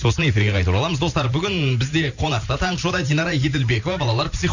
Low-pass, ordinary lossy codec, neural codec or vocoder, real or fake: 7.2 kHz; none; vocoder, 44.1 kHz, 128 mel bands every 512 samples, BigVGAN v2; fake